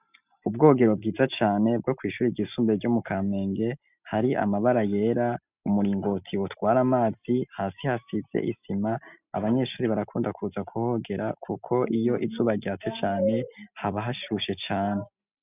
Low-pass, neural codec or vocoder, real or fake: 3.6 kHz; none; real